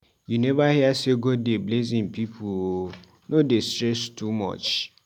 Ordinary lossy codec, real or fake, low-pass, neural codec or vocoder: none; real; 19.8 kHz; none